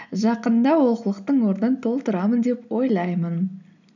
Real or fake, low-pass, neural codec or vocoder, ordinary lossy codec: real; 7.2 kHz; none; none